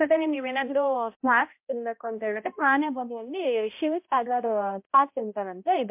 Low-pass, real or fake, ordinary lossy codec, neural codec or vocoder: 3.6 kHz; fake; MP3, 32 kbps; codec, 16 kHz, 0.5 kbps, X-Codec, HuBERT features, trained on balanced general audio